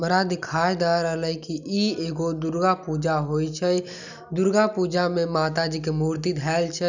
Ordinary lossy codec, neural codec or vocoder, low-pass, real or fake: none; none; 7.2 kHz; real